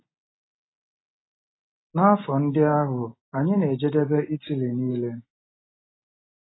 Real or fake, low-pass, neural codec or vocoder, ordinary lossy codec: real; 7.2 kHz; none; AAC, 16 kbps